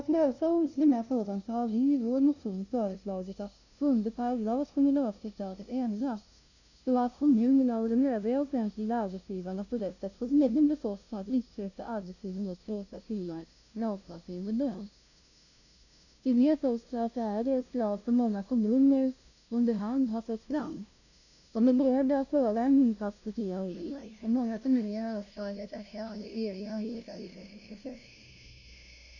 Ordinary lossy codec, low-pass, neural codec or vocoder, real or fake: none; 7.2 kHz; codec, 16 kHz, 0.5 kbps, FunCodec, trained on LibriTTS, 25 frames a second; fake